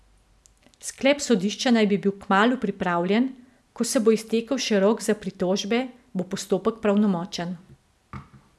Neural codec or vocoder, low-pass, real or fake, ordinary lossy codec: none; none; real; none